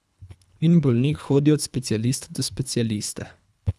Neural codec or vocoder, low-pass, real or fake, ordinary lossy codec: codec, 24 kHz, 3 kbps, HILCodec; none; fake; none